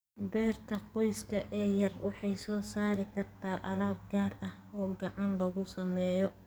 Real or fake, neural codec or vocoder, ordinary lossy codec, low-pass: fake; codec, 44.1 kHz, 2.6 kbps, SNAC; none; none